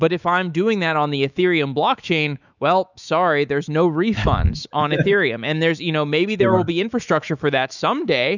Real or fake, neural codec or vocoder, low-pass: fake; autoencoder, 48 kHz, 128 numbers a frame, DAC-VAE, trained on Japanese speech; 7.2 kHz